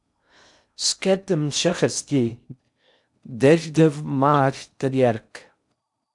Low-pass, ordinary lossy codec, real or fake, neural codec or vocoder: 10.8 kHz; MP3, 96 kbps; fake; codec, 16 kHz in and 24 kHz out, 0.6 kbps, FocalCodec, streaming, 2048 codes